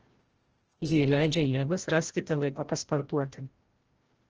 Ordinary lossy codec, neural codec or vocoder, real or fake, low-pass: Opus, 16 kbps; codec, 16 kHz, 0.5 kbps, FreqCodec, larger model; fake; 7.2 kHz